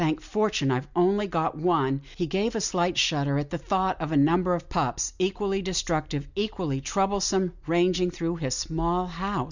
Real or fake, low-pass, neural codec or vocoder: real; 7.2 kHz; none